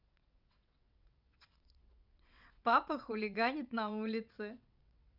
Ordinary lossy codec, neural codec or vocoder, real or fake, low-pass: none; vocoder, 22.05 kHz, 80 mel bands, WaveNeXt; fake; 5.4 kHz